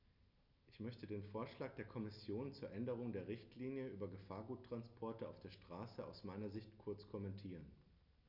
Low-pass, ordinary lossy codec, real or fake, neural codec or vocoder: 5.4 kHz; none; real; none